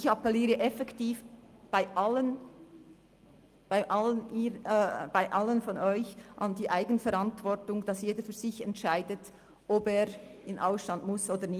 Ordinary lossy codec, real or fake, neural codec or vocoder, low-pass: Opus, 32 kbps; real; none; 14.4 kHz